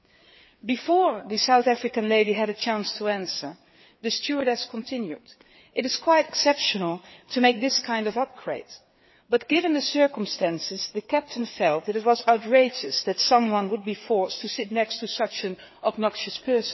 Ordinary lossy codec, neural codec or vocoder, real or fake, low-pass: MP3, 24 kbps; codec, 16 kHz, 4 kbps, FreqCodec, larger model; fake; 7.2 kHz